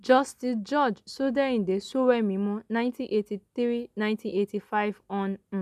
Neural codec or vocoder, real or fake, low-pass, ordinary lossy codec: none; real; 14.4 kHz; none